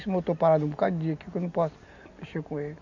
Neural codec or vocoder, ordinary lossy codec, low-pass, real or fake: none; none; 7.2 kHz; real